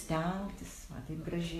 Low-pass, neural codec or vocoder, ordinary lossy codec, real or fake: 14.4 kHz; none; AAC, 48 kbps; real